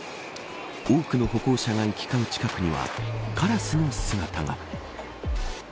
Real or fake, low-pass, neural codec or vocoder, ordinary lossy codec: real; none; none; none